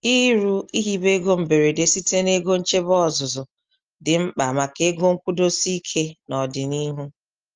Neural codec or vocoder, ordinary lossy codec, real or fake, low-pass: none; Opus, 32 kbps; real; 7.2 kHz